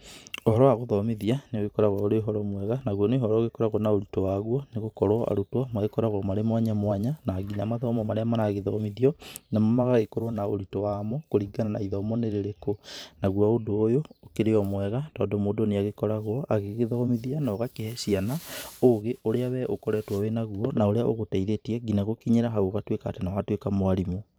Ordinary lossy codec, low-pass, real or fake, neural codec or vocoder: none; none; fake; vocoder, 44.1 kHz, 128 mel bands every 512 samples, BigVGAN v2